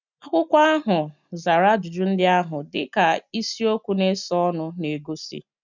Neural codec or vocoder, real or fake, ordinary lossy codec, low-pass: none; real; none; 7.2 kHz